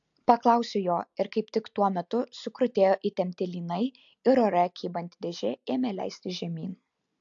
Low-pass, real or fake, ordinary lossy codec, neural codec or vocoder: 7.2 kHz; real; AAC, 64 kbps; none